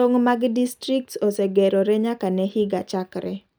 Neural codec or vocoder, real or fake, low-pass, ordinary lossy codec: none; real; none; none